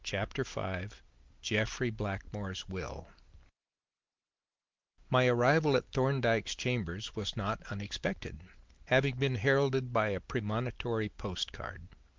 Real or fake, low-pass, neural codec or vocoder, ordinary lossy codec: real; 7.2 kHz; none; Opus, 32 kbps